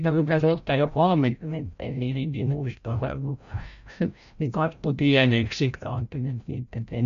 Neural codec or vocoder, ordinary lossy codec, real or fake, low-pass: codec, 16 kHz, 0.5 kbps, FreqCodec, larger model; AAC, 96 kbps; fake; 7.2 kHz